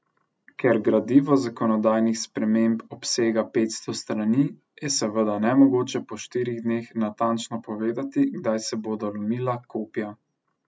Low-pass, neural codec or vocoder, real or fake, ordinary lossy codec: none; none; real; none